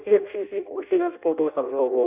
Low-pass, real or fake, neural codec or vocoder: 3.6 kHz; fake; codec, 16 kHz in and 24 kHz out, 0.6 kbps, FireRedTTS-2 codec